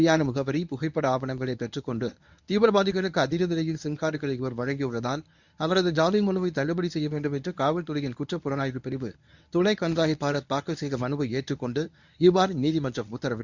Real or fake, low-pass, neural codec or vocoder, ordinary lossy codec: fake; 7.2 kHz; codec, 24 kHz, 0.9 kbps, WavTokenizer, medium speech release version 1; none